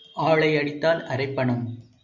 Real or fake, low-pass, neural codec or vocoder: real; 7.2 kHz; none